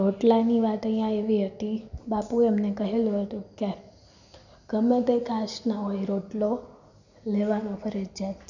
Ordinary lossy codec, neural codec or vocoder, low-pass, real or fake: none; none; 7.2 kHz; real